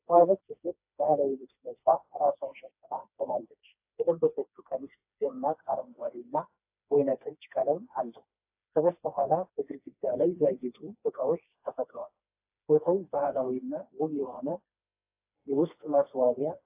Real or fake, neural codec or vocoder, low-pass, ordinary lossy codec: fake; codec, 16 kHz, 2 kbps, FreqCodec, smaller model; 3.6 kHz; AAC, 32 kbps